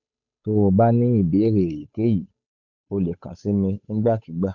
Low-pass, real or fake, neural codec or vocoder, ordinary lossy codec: 7.2 kHz; fake; codec, 16 kHz, 8 kbps, FunCodec, trained on Chinese and English, 25 frames a second; none